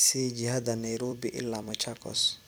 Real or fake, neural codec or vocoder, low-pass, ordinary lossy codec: fake; vocoder, 44.1 kHz, 128 mel bands every 256 samples, BigVGAN v2; none; none